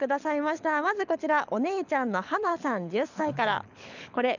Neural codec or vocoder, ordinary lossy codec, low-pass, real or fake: codec, 24 kHz, 6 kbps, HILCodec; none; 7.2 kHz; fake